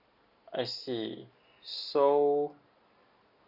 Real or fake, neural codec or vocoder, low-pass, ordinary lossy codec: real; none; 5.4 kHz; Opus, 64 kbps